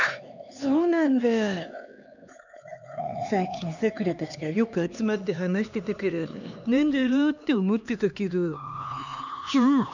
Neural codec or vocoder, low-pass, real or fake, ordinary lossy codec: codec, 16 kHz, 2 kbps, X-Codec, HuBERT features, trained on LibriSpeech; 7.2 kHz; fake; none